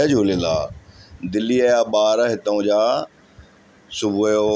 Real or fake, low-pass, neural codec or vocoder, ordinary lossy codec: real; none; none; none